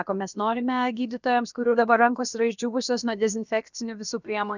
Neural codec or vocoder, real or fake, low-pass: codec, 16 kHz, about 1 kbps, DyCAST, with the encoder's durations; fake; 7.2 kHz